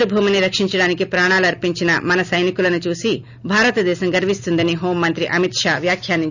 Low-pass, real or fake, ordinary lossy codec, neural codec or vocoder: 7.2 kHz; real; none; none